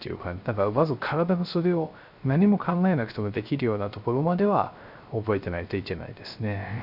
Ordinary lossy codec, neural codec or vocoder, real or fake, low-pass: none; codec, 16 kHz, 0.3 kbps, FocalCodec; fake; 5.4 kHz